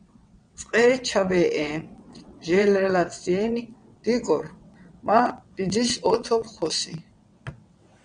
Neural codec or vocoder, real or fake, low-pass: vocoder, 22.05 kHz, 80 mel bands, WaveNeXt; fake; 9.9 kHz